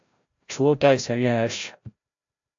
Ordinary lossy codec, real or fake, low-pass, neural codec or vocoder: AAC, 64 kbps; fake; 7.2 kHz; codec, 16 kHz, 0.5 kbps, FreqCodec, larger model